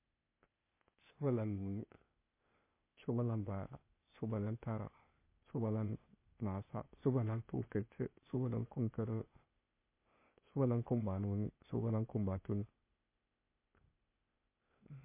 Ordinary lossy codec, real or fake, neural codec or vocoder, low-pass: MP3, 24 kbps; fake; codec, 16 kHz, 0.8 kbps, ZipCodec; 3.6 kHz